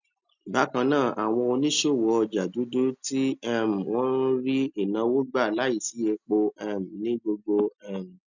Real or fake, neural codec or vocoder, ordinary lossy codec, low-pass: real; none; none; 7.2 kHz